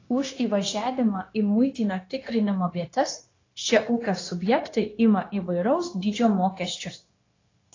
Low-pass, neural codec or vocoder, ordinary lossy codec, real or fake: 7.2 kHz; codec, 16 kHz, 0.9 kbps, LongCat-Audio-Codec; AAC, 32 kbps; fake